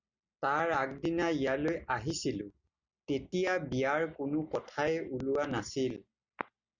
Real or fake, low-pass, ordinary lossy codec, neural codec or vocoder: real; 7.2 kHz; Opus, 64 kbps; none